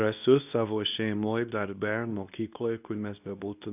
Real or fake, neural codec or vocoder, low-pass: fake; codec, 24 kHz, 0.9 kbps, WavTokenizer, medium speech release version 2; 3.6 kHz